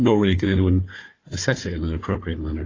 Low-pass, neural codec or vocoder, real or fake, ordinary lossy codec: 7.2 kHz; codec, 16 kHz, 4 kbps, FunCodec, trained on Chinese and English, 50 frames a second; fake; AAC, 32 kbps